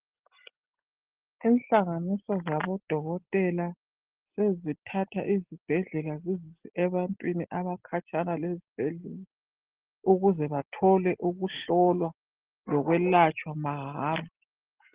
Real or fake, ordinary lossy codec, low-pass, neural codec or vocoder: real; Opus, 16 kbps; 3.6 kHz; none